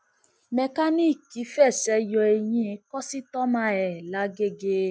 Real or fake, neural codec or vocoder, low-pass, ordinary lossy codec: real; none; none; none